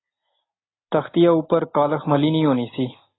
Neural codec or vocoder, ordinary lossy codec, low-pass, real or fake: none; AAC, 16 kbps; 7.2 kHz; real